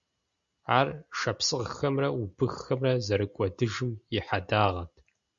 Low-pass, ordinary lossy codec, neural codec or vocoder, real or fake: 7.2 kHz; Opus, 64 kbps; none; real